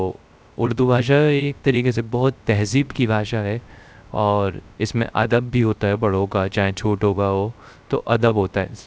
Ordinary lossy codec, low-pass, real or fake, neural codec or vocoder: none; none; fake; codec, 16 kHz, 0.3 kbps, FocalCodec